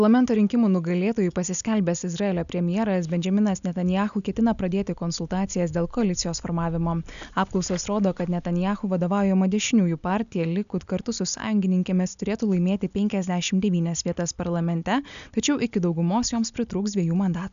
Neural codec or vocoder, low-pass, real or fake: none; 7.2 kHz; real